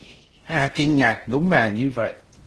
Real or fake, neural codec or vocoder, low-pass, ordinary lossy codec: fake; codec, 16 kHz in and 24 kHz out, 0.6 kbps, FocalCodec, streaming, 4096 codes; 10.8 kHz; Opus, 16 kbps